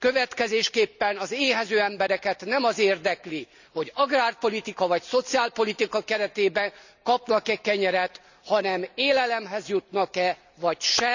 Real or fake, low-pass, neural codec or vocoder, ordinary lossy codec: real; 7.2 kHz; none; none